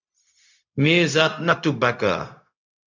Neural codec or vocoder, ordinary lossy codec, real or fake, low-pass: codec, 16 kHz, 0.4 kbps, LongCat-Audio-Codec; MP3, 64 kbps; fake; 7.2 kHz